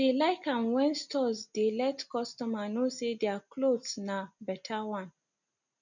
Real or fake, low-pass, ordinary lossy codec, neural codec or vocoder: real; 7.2 kHz; none; none